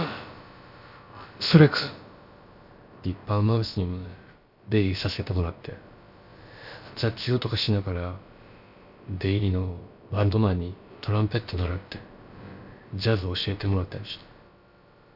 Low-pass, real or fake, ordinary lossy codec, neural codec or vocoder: 5.4 kHz; fake; none; codec, 16 kHz, about 1 kbps, DyCAST, with the encoder's durations